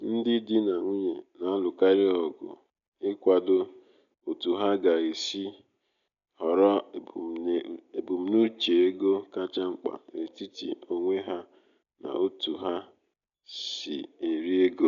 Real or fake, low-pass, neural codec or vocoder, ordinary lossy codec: real; 7.2 kHz; none; none